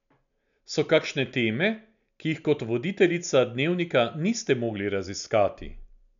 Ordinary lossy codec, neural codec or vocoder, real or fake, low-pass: none; none; real; 7.2 kHz